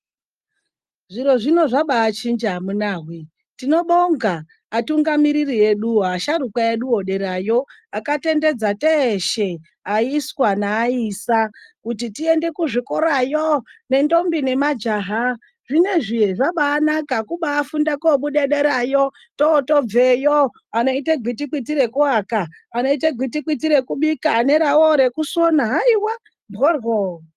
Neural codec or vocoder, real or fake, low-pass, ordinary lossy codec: none; real; 14.4 kHz; Opus, 32 kbps